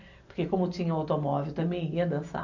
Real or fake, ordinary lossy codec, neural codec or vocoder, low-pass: real; none; none; 7.2 kHz